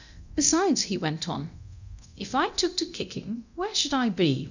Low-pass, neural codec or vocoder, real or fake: 7.2 kHz; codec, 24 kHz, 0.9 kbps, DualCodec; fake